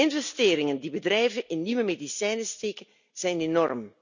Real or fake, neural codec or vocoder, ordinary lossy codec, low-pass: real; none; none; 7.2 kHz